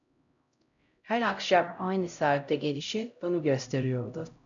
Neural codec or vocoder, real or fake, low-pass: codec, 16 kHz, 0.5 kbps, X-Codec, HuBERT features, trained on LibriSpeech; fake; 7.2 kHz